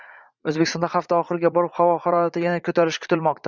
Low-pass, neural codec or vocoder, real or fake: 7.2 kHz; vocoder, 44.1 kHz, 80 mel bands, Vocos; fake